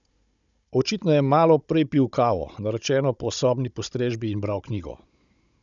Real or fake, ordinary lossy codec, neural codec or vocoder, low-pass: fake; none; codec, 16 kHz, 16 kbps, FunCodec, trained on Chinese and English, 50 frames a second; 7.2 kHz